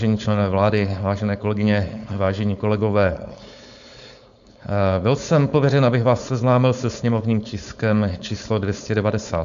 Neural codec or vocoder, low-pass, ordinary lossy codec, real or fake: codec, 16 kHz, 4.8 kbps, FACodec; 7.2 kHz; AAC, 96 kbps; fake